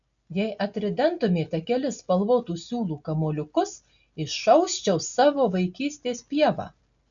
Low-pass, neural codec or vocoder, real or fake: 7.2 kHz; none; real